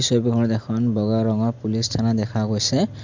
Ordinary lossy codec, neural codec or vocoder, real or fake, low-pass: MP3, 64 kbps; none; real; 7.2 kHz